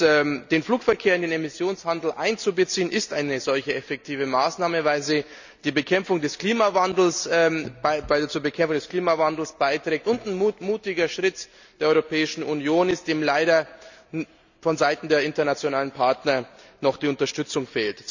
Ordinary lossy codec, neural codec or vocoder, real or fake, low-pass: none; none; real; 7.2 kHz